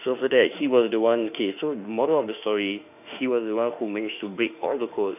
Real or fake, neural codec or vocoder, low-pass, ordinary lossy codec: fake; autoencoder, 48 kHz, 32 numbers a frame, DAC-VAE, trained on Japanese speech; 3.6 kHz; none